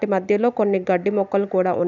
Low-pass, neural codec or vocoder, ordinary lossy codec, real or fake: 7.2 kHz; none; none; real